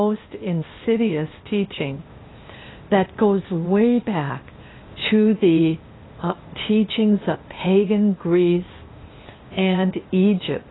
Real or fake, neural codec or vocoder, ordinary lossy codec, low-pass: fake; codec, 16 kHz, 0.8 kbps, ZipCodec; AAC, 16 kbps; 7.2 kHz